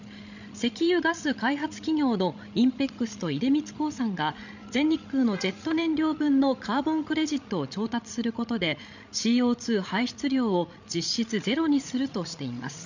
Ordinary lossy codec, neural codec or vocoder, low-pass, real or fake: none; codec, 16 kHz, 16 kbps, FreqCodec, larger model; 7.2 kHz; fake